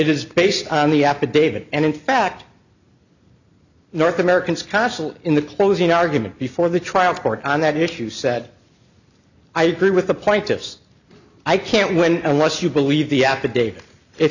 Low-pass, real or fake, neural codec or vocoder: 7.2 kHz; real; none